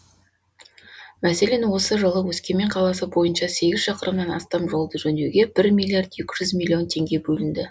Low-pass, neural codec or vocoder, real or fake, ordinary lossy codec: none; none; real; none